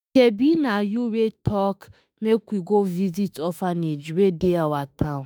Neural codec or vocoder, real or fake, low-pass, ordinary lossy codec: autoencoder, 48 kHz, 32 numbers a frame, DAC-VAE, trained on Japanese speech; fake; none; none